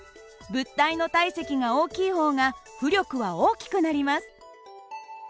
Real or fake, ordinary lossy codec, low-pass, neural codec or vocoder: real; none; none; none